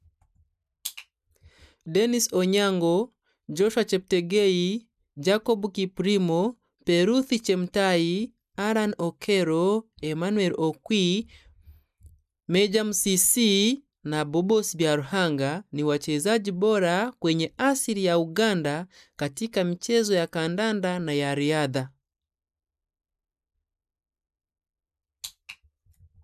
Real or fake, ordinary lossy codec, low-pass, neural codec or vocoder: real; none; 14.4 kHz; none